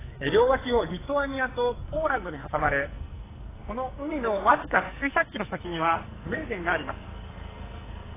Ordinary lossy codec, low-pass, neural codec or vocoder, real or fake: AAC, 16 kbps; 3.6 kHz; codec, 44.1 kHz, 2.6 kbps, SNAC; fake